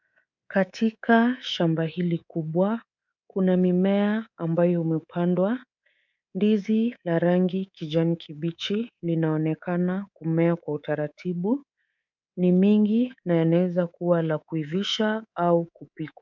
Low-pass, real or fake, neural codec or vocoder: 7.2 kHz; fake; codec, 24 kHz, 3.1 kbps, DualCodec